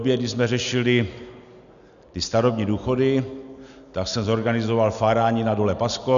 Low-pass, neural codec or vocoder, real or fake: 7.2 kHz; none; real